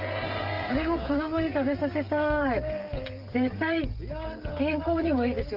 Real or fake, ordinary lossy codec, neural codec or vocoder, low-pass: fake; Opus, 32 kbps; codec, 16 kHz, 16 kbps, FreqCodec, smaller model; 5.4 kHz